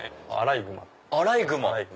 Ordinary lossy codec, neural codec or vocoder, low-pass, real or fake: none; none; none; real